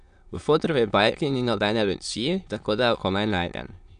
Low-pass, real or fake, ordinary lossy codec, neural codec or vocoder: 9.9 kHz; fake; AAC, 96 kbps; autoencoder, 22.05 kHz, a latent of 192 numbers a frame, VITS, trained on many speakers